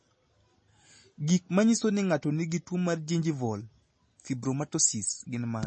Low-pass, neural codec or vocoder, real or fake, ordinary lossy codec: 10.8 kHz; none; real; MP3, 32 kbps